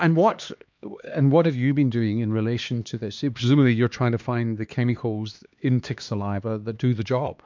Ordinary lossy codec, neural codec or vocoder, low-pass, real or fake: MP3, 64 kbps; codec, 16 kHz, 2 kbps, X-Codec, HuBERT features, trained on LibriSpeech; 7.2 kHz; fake